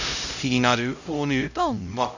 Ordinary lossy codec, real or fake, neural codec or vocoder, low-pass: none; fake; codec, 16 kHz, 0.5 kbps, X-Codec, HuBERT features, trained on LibriSpeech; 7.2 kHz